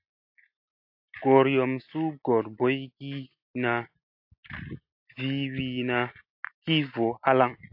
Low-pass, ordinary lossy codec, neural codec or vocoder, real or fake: 5.4 kHz; AAC, 48 kbps; none; real